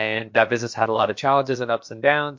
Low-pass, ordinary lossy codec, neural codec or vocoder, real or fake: 7.2 kHz; MP3, 48 kbps; codec, 16 kHz, about 1 kbps, DyCAST, with the encoder's durations; fake